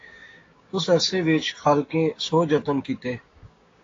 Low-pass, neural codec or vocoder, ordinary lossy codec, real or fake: 7.2 kHz; codec, 16 kHz, 6 kbps, DAC; AAC, 32 kbps; fake